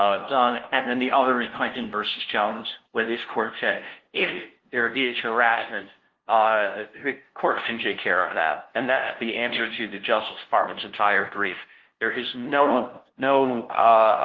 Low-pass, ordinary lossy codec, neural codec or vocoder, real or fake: 7.2 kHz; Opus, 16 kbps; codec, 16 kHz, 0.5 kbps, FunCodec, trained on LibriTTS, 25 frames a second; fake